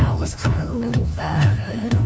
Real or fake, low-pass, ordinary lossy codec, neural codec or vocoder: fake; none; none; codec, 16 kHz, 1 kbps, FunCodec, trained on LibriTTS, 50 frames a second